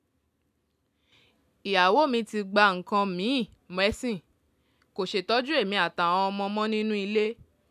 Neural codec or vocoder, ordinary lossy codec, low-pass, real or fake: none; none; 14.4 kHz; real